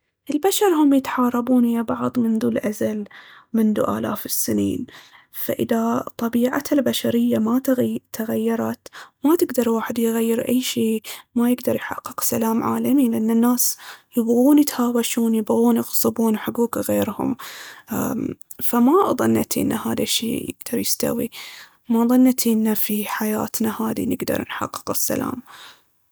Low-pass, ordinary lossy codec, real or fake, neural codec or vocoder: none; none; real; none